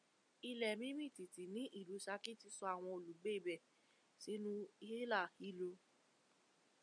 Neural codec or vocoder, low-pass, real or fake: none; 9.9 kHz; real